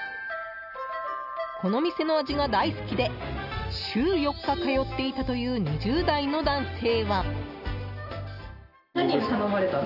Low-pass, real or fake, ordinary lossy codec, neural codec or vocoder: 5.4 kHz; real; none; none